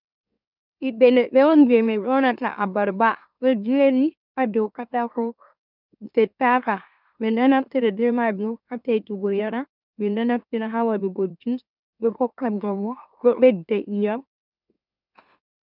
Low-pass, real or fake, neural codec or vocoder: 5.4 kHz; fake; autoencoder, 44.1 kHz, a latent of 192 numbers a frame, MeloTTS